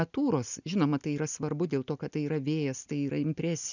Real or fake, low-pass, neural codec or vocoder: real; 7.2 kHz; none